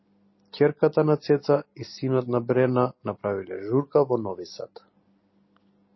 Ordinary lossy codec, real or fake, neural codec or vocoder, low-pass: MP3, 24 kbps; real; none; 7.2 kHz